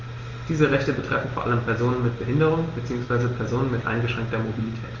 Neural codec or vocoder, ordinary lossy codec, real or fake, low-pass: none; Opus, 32 kbps; real; 7.2 kHz